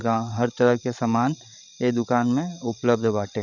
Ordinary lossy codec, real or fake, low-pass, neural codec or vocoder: none; fake; 7.2 kHz; codec, 16 kHz, 16 kbps, FreqCodec, larger model